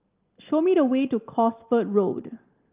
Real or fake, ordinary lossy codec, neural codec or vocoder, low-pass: real; Opus, 32 kbps; none; 3.6 kHz